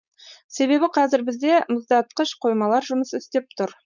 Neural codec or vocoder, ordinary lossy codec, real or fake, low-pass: codec, 16 kHz, 4.8 kbps, FACodec; none; fake; 7.2 kHz